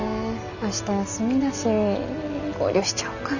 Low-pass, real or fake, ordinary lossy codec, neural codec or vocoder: 7.2 kHz; real; none; none